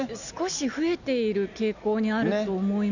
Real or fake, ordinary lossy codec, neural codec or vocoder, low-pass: real; none; none; 7.2 kHz